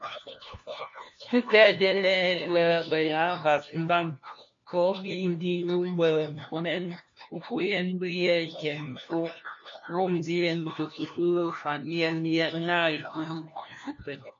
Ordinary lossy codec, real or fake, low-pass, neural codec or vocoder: MP3, 48 kbps; fake; 7.2 kHz; codec, 16 kHz, 1 kbps, FunCodec, trained on LibriTTS, 50 frames a second